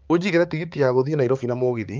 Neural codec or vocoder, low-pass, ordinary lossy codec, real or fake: codec, 16 kHz, 4 kbps, X-Codec, HuBERT features, trained on balanced general audio; 7.2 kHz; Opus, 24 kbps; fake